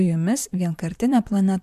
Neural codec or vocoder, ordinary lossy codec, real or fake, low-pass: vocoder, 44.1 kHz, 128 mel bands, Pupu-Vocoder; MP3, 96 kbps; fake; 14.4 kHz